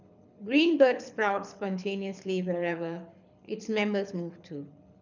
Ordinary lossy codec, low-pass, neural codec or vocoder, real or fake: none; 7.2 kHz; codec, 24 kHz, 6 kbps, HILCodec; fake